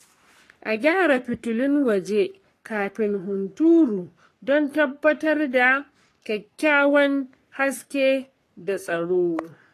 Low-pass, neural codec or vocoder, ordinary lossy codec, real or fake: 14.4 kHz; codec, 44.1 kHz, 3.4 kbps, Pupu-Codec; AAC, 48 kbps; fake